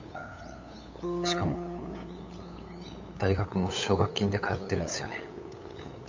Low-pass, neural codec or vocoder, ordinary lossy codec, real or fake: 7.2 kHz; codec, 16 kHz, 8 kbps, FunCodec, trained on LibriTTS, 25 frames a second; MP3, 48 kbps; fake